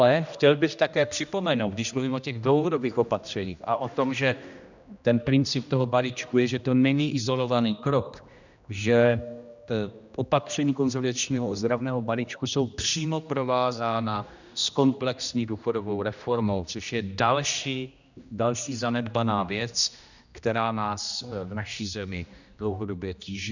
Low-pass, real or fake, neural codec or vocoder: 7.2 kHz; fake; codec, 16 kHz, 1 kbps, X-Codec, HuBERT features, trained on general audio